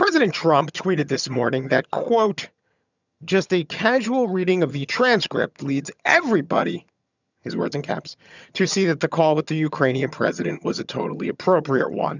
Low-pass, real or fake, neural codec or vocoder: 7.2 kHz; fake; vocoder, 22.05 kHz, 80 mel bands, HiFi-GAN